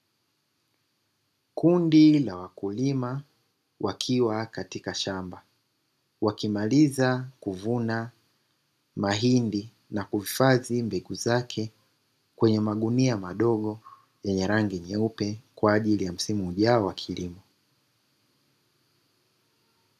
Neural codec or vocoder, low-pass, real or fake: none; 14.4 kHz; real